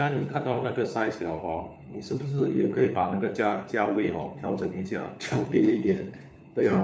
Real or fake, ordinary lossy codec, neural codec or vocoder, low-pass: fake; none; codec, 16 kHz, 4 kbps, FunCodec, trained on LibriTTS, 50 frames a second; none